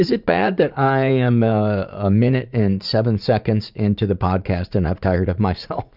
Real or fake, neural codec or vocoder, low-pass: fake; vocoder, 44.1 kHz, 80 mel bands, Vocos; 5.4 kHz